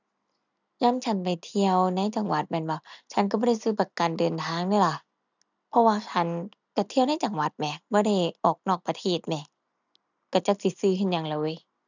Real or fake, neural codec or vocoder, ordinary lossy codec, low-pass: real; none; none; 7.2 kHz